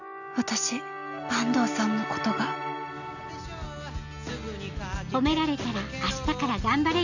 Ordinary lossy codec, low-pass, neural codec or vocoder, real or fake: none; 7.2 kHz; none; real